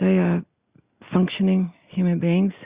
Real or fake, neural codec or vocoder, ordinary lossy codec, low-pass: real; none; Opus, 64 kbps; 3.6 kHz